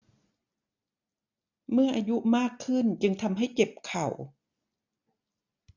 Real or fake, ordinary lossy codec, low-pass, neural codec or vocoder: real; none; 7.2 kHz; none